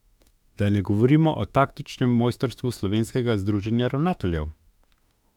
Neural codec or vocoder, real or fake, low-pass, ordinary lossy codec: autoencoder, 48 kHz, 32 numbers a frame, DAC-VAE, trained on Japanese speech; fake; 19.8 kHz; none